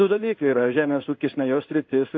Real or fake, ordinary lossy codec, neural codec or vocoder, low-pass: fake; AAC, 48 kbps; codec, 16 kHz in and 24 kHz out, 1 kbps, XY-Tokenizer; 7.2 kHz